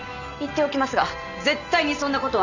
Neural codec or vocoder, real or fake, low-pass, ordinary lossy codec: none; real; 7.2 kHz; none